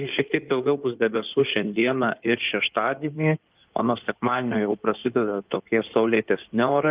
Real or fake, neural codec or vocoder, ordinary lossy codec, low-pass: fake; codec, 16 kHz in and 24 kHz out, 2.2 kbps, FireRedTTS-2 codec; Opus, 24 kbps; 3.6 kHz